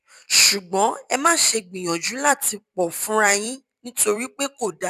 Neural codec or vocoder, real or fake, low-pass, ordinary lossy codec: vocoder, 44.1 kHz, 128 mel bands every 256 samples, BigVGAN v2; fake; 14.4 kHz; none